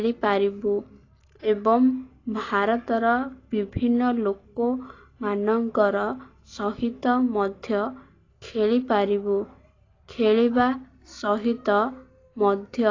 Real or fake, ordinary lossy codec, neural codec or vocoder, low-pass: real; AAC, 32 kbps; none; 7.2 kHz